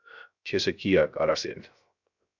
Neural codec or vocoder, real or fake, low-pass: codec, 16 kHz, 0.3 kbps, FocalCodec; fake; 7.2 kHz